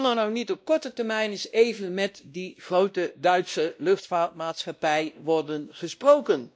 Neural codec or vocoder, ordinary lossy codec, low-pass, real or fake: codec, 16 kHz, 1 kbps, X-Codec, WavLM features, trained on Multilingual LibriSpeech; none; none; fake